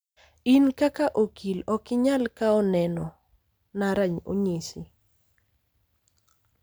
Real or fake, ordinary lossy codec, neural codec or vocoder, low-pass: real; none; none; none